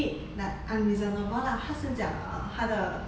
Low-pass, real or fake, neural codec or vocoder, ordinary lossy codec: none; real; none; none